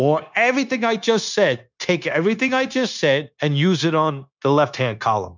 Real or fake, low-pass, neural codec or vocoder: fake; 7.2 kHz; codec, 16 kHz, 0.9 kbps, LongCat-Audio-Codec